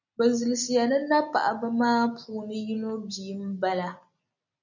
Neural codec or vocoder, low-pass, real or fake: none; 7.2 kHz; real